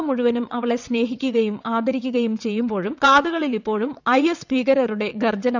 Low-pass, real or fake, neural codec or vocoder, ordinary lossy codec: 7.2 kHz; fake; vocoder, 22.05 kHz, 80 mel bands, WaveNeXt; none